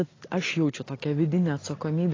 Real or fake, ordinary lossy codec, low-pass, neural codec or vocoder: real; AAC, 32 kbps; 7.2 kHz; none